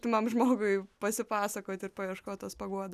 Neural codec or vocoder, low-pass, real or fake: none; 14.4 kHz; real